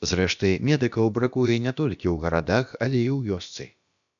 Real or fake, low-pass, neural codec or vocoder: fake; 7.2 kHz; codec, 16 kHz, about 1 kbps, DyCAST, with the encoder's durations